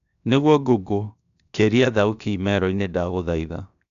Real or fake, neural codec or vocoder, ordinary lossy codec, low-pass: fake; codec, 16 kHz, 0.7 kbps, FocalCodec; none; 7.2 kHz